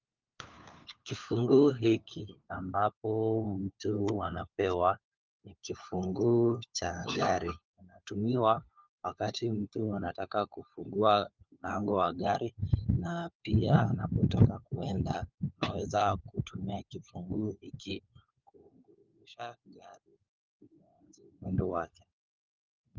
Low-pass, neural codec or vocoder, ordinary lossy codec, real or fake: 7.2 kHz; codec, 16 kHz, 4 kbps, FunCodec, trained on LibriTTS, 50 frames a second; Opus, 24 kbps; fake